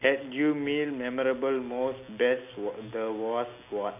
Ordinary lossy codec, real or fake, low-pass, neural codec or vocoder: none; real; 3.6 kHz; none